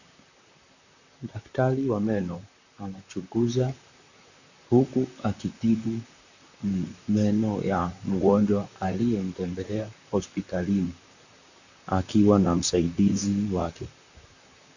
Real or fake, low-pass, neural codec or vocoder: fake; 7.2 kHz; vocoder, 44.1 kHz, 128 mel bands, Pupu-Vocoder